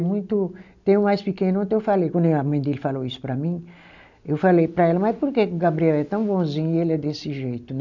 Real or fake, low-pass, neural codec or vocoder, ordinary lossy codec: real; 7.2 kHz; none; none